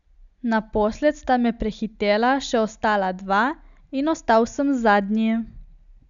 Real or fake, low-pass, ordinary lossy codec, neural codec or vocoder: real; 7.2 kHz; none; none